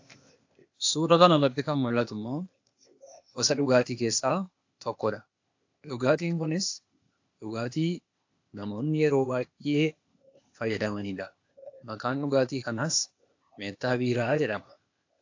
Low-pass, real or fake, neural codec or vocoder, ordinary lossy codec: 7.2 kHz; fake; codec, 16 kHz, 0.8 kbps, ZipCodec; AAC, 48 kbps